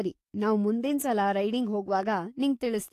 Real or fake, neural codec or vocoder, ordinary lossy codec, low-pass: fake; codec, 44.1 kHz, 7.8 kbps, DAC; AAC, 64 kbps; 14.4 kHz